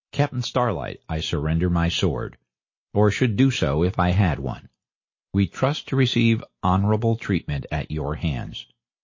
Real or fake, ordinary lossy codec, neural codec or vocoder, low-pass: real; MP3, 32 kbps; none; 7.2 kHz